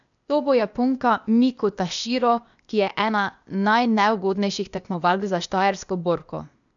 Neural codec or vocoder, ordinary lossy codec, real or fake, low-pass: codec, 16 kHz, 0.8 kbps, ZipCodec; none; fake; 7.2 kHz